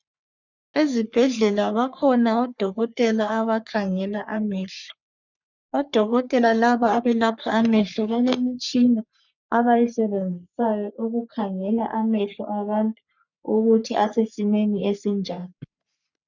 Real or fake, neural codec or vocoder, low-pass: fake; codec, 44.1 kHz, 3.4 kbps, Pupu-Codec; 7.2 kHz